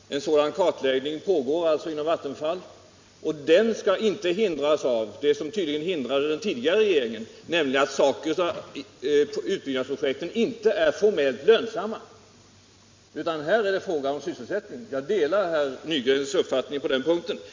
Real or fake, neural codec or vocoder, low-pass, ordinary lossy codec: real; none; 7.2 kHz; MP3, 64 kbps